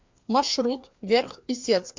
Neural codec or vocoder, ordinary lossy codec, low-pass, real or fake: codec, 16 kHz, 2 kbps, FreqCodec, larger model; MP3, 48 kbps; 7.2 kHz; fake